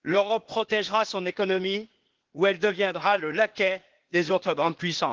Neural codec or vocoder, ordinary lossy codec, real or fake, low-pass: codec, 16 kHz, 0.8 kbps, ZipCodec; Opus, 16 kbps; fake; 7.2 kHz